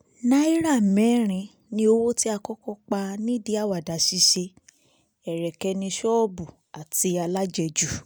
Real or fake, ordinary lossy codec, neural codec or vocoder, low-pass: real; none; none; none